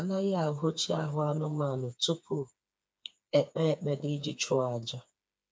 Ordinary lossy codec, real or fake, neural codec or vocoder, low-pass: none; fake; codec, 16 kHz, 4 kbps, FreqCodec, smaller model; none